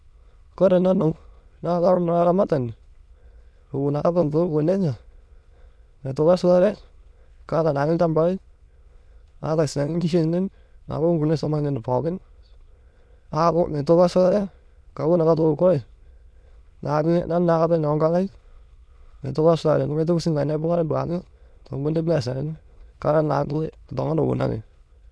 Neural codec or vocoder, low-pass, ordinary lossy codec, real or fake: autoencoder, 22.05 kHz, a latent of 192 numbers a frame, VITS, trained on many speakers; none; none; fake